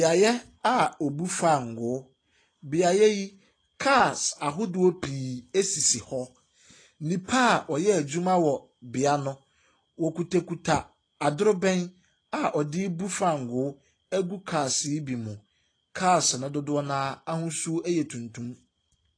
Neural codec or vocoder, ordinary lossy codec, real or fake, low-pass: none; AAC, 32 kbps; real; 9.9 kHz